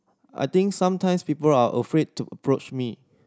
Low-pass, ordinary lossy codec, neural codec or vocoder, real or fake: none; none; none; real